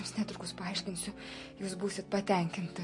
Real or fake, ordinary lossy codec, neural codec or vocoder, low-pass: real; MP3, 48 kbps; none; 10.8 kHz